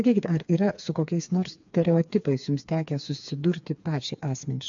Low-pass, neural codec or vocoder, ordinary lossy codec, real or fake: 7.2 kHz; codec, 16 kHz, 4 kbps, FreqCodec, smaller model; AAC, 64 kbps; fake